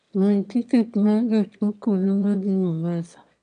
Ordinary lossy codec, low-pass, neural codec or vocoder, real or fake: none; 9.9 kHz; autoencoder, 22.05 kHz, a latent of 192 numbers a frame, VITS, trained on one speaker; fake